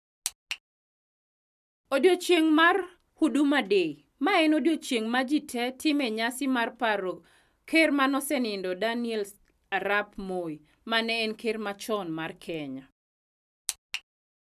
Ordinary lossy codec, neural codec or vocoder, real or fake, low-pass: none; none; real; 14.4 kHz